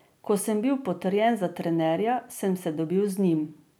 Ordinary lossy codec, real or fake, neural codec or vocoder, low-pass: none; real; none; none